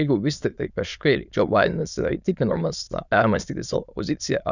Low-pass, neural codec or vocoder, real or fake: 7.2 kHz; autoencoder, 22.05 kHz, a latent of 192 numbers a frame, VITS, trained on many speakers; fake